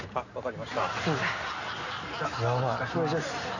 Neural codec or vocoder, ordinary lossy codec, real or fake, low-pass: none; none; real; 7.2 kHz